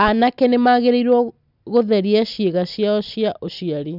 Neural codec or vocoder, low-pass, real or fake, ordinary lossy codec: none; 5.4 kHz; real; none